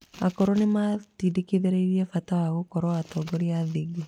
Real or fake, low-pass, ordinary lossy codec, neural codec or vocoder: real; 19.8 kHz; none; none